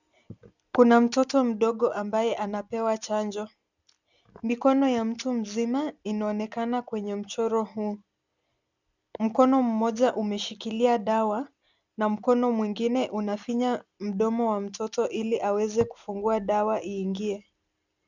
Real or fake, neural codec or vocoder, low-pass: real; none; 7.2 kHz